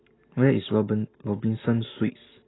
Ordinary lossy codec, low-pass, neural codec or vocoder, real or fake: AAC, 16 kbps; 7.2 kHz; none; real